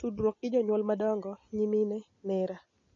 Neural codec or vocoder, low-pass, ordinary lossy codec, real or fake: none; 7.2 kHz; MP3, 32 kbps; real